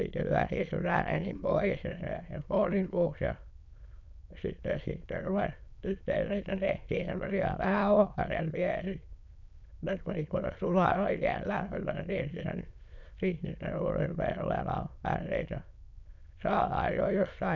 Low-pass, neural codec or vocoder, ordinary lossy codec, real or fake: 7.2 kHz; autoencoder, 22.05 kHz, a latent of 192 numbers a frame, VITS, trained on many speakers; none; fake